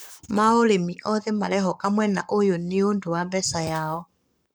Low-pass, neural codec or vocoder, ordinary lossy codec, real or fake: none; codec, 44.1 kHz, 7.8 kbps, Pupu-Codec; none; fake